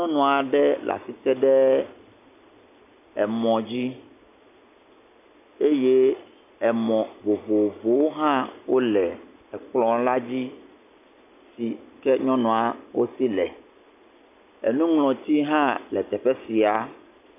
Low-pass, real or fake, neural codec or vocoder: 3.6 kHz; real; none